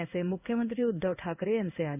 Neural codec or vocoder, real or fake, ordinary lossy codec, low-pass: codec, 16 kHz in and 24 kHz out, 1 kbps, XY-Tokenizer; fake; none; 3.6 kHz